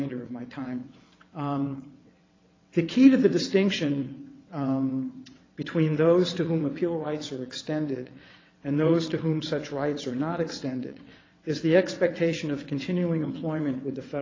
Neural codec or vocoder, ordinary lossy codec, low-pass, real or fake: vocoder, 22.05 kHz, 80 mel bands, WaveNeXt; AAC, 32 kbps; 7.2 kHz; fake